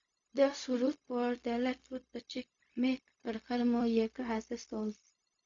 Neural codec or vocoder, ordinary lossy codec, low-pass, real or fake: codec, 16 kHz, 0.4 kbps, LongCat-Audio-Codec; AAC, 64 kbps; 7.2 kHz; fake